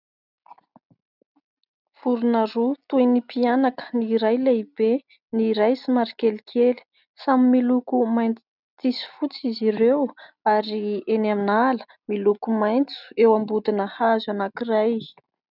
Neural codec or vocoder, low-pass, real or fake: none; 5.4 kHz; real